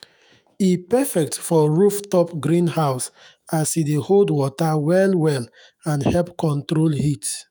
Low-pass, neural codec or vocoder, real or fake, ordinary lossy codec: none; autoencoder, 48 kHz, 128 numbers a frame, DAC-VAE, trained on Japanese speech; fake; none